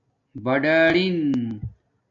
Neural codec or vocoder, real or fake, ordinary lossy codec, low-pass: none; real; AAC, 48 kbps; 7.2 kHz